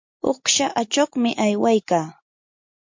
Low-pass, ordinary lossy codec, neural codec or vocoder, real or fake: 7.2 kHz; MP3, 48 kbps; none; real